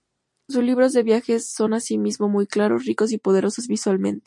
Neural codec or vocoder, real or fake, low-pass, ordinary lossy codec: none; real; 10.8 kHz; MP3, 48 kbps